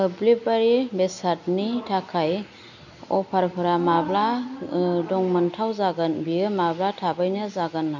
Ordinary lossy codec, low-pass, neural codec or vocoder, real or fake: none; 7.2 kHz; none; real